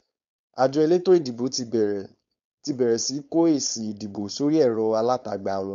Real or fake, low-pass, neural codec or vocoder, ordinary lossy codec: fake; 7.2 kHz; codec, 16 kHz, 4.8 kbps, FACodec; MP3, 64 kbps